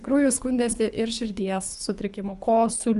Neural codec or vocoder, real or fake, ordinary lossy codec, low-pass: codec, 24 kHz, 3 kbps, HILCodec; fake; Opus, 64 kbps; 10.8 kHz